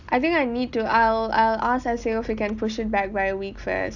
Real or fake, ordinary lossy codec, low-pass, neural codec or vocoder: real; none; 7.2 kHz; none